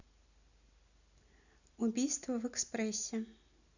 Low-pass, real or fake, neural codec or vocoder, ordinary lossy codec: 7.2 kHz; real; none; none